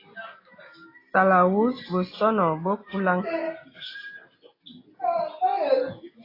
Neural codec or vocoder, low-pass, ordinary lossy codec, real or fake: none; 5.4 kHz; AAC, 24 kbps; real